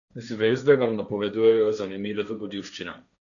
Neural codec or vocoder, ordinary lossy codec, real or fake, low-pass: codec, 16 kHz, 1.1 kbps, Voila-Tokenizer; none; fake; 7.2 kHz